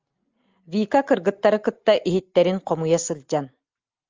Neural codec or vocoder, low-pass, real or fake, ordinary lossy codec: none; 7.2 kHz; real; Opus, 32 kbps